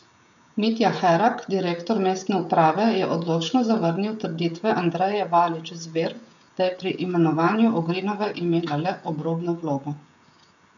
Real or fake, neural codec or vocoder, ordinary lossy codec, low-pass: fake; codec, 16 kHz, 16 kbps, FreqCodec, smaller model; none; 7.2 kHz